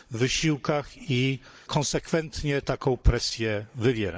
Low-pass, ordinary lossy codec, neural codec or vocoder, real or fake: none; none; codec, 16 kHz, 16 kbps, FunCodec, trained on Chinese and English, 50 frames a second; fake